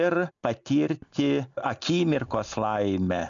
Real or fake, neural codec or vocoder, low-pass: real; none; 7.2 kHz